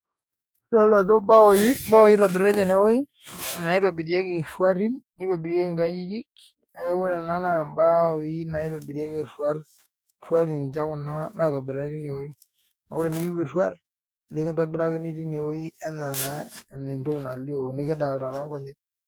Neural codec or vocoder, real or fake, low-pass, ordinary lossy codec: codec, 44.1 kHz, 2.6 kbps, DAC; fake; none; none